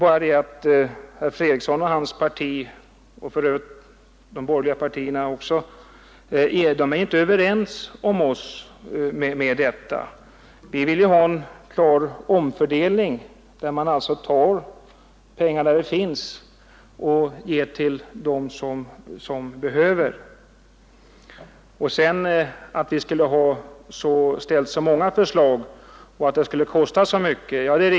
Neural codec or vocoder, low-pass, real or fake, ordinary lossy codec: none; none; real; none